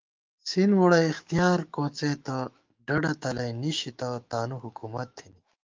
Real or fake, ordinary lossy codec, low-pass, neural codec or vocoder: fake; Opus, 24 kbps; 7.2 kHz; autoencoder, 48 kHz, 128 numbers a frame, DAC-VAE, trained on Japanese speech